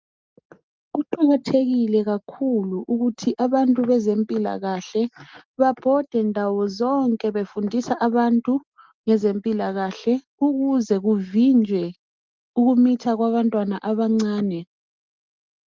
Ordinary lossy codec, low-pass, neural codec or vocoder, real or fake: Opus, 32 kbps; 7.2 kHz; none; real